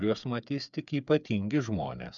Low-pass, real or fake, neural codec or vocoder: 7.2 kHz; fake; codec, 16 kHz, 8 kbps, FreqCodec, smaller model